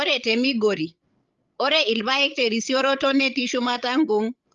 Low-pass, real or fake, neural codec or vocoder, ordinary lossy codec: 7.2 kHz; fake; codec, 16 kHz, 16 kbps, FreqCodec, larger model; Opus, 32 kbps